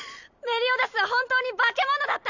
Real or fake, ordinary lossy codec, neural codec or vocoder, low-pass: real; none; none; 7.2 kHz